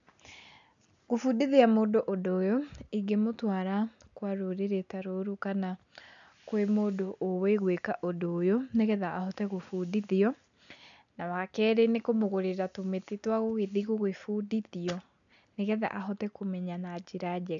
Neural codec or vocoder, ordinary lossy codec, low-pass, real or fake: none; none; 7.2 kHz; real